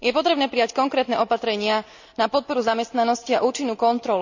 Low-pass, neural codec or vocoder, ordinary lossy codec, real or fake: 7.2 kHz; none; none; real